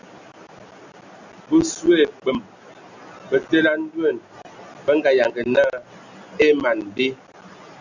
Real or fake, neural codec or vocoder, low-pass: real; none; 7.2 kHz